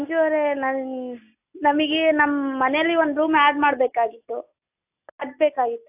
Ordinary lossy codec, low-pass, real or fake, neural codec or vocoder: AAC, 32 kbps; 3.6 kHz; real; none